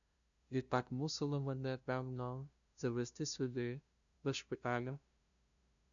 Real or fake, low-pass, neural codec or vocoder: fake; 7.2 kHz; codec, 16 kHz, 0.5 kbps, FunCodec, trained on LibriTTS, 25 frames a second